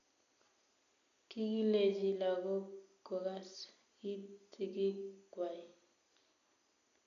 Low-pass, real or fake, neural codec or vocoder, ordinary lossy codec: 7.2 kHz; real; none; none